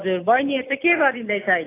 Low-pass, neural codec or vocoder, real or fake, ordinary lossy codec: 3.6 kHz; none; real; AAC, 24 kbps